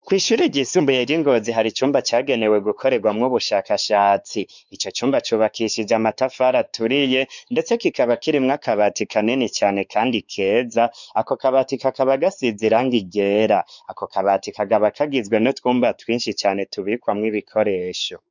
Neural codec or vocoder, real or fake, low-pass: codec, 16 kHz, 4 kbps, X-Codec, WavLM features, trained on Multilingual LibriSpeech; fake; 7.2 kHz